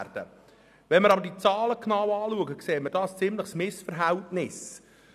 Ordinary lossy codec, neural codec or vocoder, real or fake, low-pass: none; none; real; 14.4 kHz